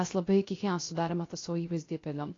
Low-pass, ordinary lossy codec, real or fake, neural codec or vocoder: 7.2 kHz; AAC, 32 kbps; fake; codec, 16 kHz, 0.3 kbps, FocalCodec